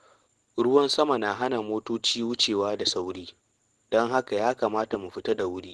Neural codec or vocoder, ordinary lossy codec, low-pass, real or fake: none; Opus, 16 kbps; 10.8 kHz; real